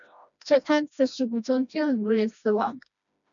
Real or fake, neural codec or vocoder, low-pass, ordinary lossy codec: fake; codec, 16 kHz, 1 kbps, FreqCodec, smaller model; 7.2 kHz; MP3, 96 kbps